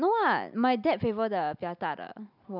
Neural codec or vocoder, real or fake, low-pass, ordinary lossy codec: none; real; 5.4 kHz; none